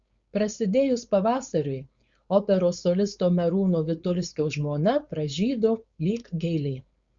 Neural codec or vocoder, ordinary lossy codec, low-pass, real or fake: codec, 16 kHz, 4.8 kbps, FACodec; Opus, 64 kbps; 7.2 kHz; fake